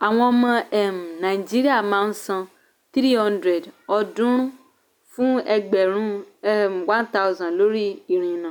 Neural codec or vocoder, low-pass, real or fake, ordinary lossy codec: none; none; real; none